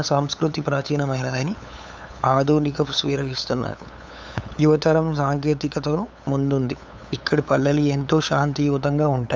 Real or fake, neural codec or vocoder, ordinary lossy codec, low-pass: fake; codec, 16 kHz, 8 kbps, FunCodec, trained on LibriTTS, 25 frames a second; none; none